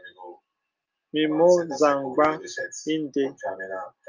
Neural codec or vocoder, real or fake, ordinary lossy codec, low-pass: none; real; Opus, 24 kbps; 7.2 kHz